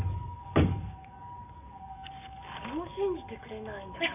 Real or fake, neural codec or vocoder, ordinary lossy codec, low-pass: fake; codec, 44.1 kHz, 7.8 kbps, DAC; none; 3.6 kHz